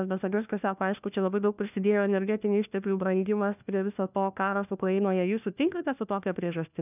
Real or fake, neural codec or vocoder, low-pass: fake; codec, 16 kHz, 1 kbps, FunCodec, trained on LibriTTS, 50 frames a second; 3.6 kHz